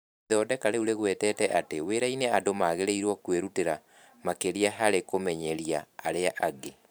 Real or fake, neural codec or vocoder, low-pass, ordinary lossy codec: fake; vocoder, 44.1 kHz, 128 mel bands every 512 samples, BigVGAN v2; none; none